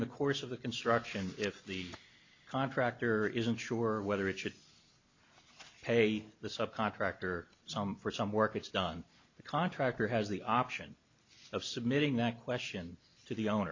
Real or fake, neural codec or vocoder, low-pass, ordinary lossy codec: real; none; 7.2 kHz; MP3, 48 kbps